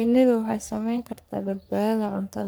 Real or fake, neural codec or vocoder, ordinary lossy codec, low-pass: fake; codec, 44.1 kHz, 3.4 kbps, Pupu-Codec; none; none